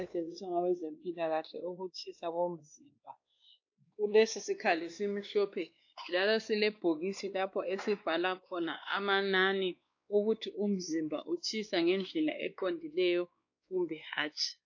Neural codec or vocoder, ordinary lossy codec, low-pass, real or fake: codec, 16 kHz, 2 kbps, X-Codec, WavLM features, trained on Multilingual LibriSpeech; AAC, 48 kbps; 7.2 kHz; fake